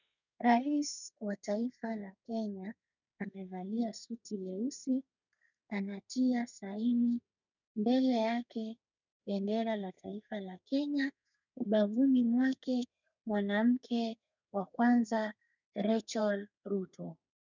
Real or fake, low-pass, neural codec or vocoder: fake; 7.2 kHz; codec, 32 kHz, 1.9 kbps, SNAC